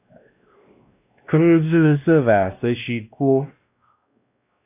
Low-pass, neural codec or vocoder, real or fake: 3.6 kHz; codec, 16 kHz, 1 kbps, X-Codec, WavLM features, trained on Multilingual LibriSpeech; fake